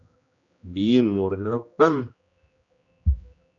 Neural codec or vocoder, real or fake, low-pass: codec, 16 kHz, 1 kbps, X-Codec, HuBERT features, trained on general audio; fake; 7.2 kHz